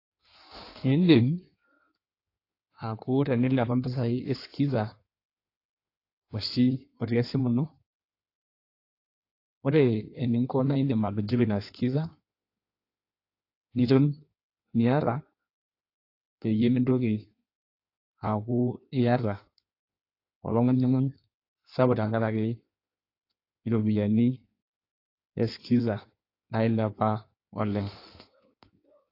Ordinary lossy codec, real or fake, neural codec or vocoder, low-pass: AAC, 32 kbps; fake; codec, 16 kHz in and 24 kHz out, 1.1 kbps, FireRedTTS-2 codec; 5.4 kHz